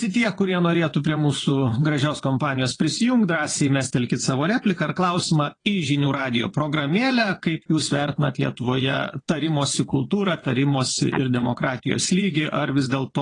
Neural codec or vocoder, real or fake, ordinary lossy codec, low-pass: vocoder, 22.05 kHz, 80 mel bands, WaveNeXt; fake; AAC, 32 kbps; 9.9 kHz